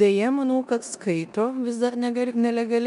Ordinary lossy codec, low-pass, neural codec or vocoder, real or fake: MP3, 96 kbps; 10.8 kHz; codec, 16 kHz in and 24 kHz out, 0.9 kbps, LongCat-Audio-Codec, four codebook decoder; fake